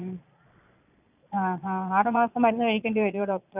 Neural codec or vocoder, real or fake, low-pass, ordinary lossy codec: vocoder, 22.05 kHz, 80 mel bands, Vocos; fake; 3.6 kHz; none